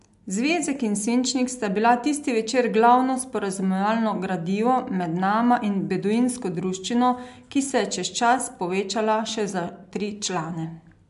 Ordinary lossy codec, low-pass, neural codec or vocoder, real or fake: MP3, 64 kbps; 10.8 kHz; none; real